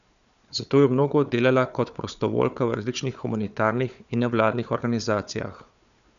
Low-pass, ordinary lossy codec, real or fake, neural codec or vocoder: 7.2 kHz; none; fake; codec, 16 kHz, 4 kbps, FunCodec, trained on Chinese and English, 50 frames a second